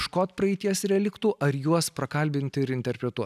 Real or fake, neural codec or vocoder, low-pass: real; none; 14.4 kHz